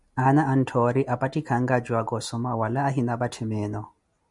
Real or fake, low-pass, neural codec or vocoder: real; 10.8 kHz; none